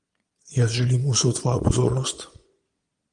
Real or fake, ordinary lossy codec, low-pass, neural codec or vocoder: fake; Opus, 24 kbps; 9.9 kHz; vocoder, 22.05 kHz, 80 mel bands, Vocos